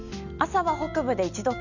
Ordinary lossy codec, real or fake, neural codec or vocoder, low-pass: none; real; none; 7.2 kHz